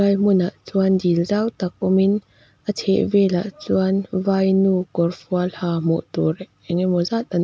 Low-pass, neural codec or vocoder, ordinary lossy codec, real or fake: none; none; none; real